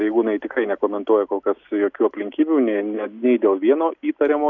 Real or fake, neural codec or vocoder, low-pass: real; none; 7.2 kHz